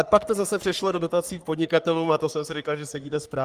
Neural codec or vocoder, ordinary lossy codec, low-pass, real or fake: codec, 44.1 kHz, 3.4 kbps, Pupu-Codec; Opus, 32 kbps; 14.4 kHz; fake